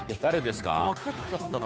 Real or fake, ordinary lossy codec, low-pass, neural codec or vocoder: fake; none; none; codec, 16 kHz, 2 kbps, FunCodec, trained on Chinese and English, 25 frames a second